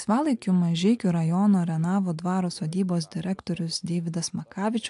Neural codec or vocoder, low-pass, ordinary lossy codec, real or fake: none; 10.8 kHz; AAC, 64 kbps; real